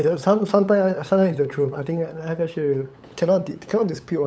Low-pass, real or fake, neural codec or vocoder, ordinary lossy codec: none; fake; codec, 16 kHz, 8 kbps, FunCodec, trained on LibriTTS, 25 frames a second; none